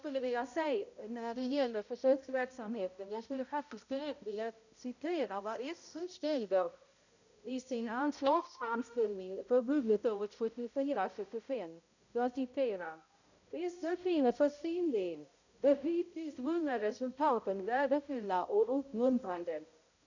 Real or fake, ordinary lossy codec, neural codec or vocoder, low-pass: fake; none; codec, 16 kHz, 0.5 kbps, X-Codec, HuBERT features, trained on balanced general audio; 7.2 kHz